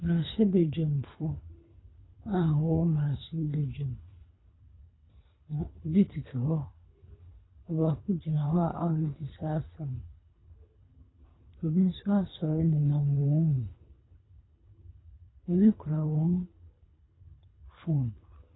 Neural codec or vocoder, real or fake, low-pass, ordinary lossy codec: codec, 24 kHz, 3 kbps, HILCodec; fake; 7.2 kHz; AAC, 16 kbps